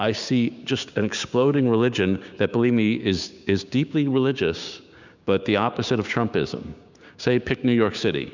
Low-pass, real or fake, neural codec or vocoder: 7.2 kHz; fake; codec, 16 kHz, 6 kbps, DAC